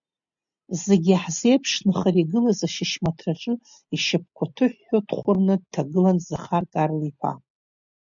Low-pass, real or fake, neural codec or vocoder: 7.2 kHz; real; none